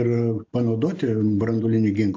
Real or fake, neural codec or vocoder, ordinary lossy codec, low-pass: real; none; AAC, 48 kbps; 7.2 kHz